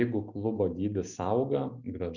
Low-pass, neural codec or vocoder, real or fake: 7.2 kHz; none; real